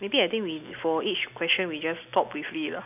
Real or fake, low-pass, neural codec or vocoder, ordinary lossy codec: real; 3.6 kHz; none; none